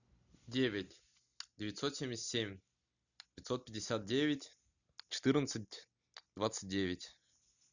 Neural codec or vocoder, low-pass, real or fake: none; 7.2 kHz; real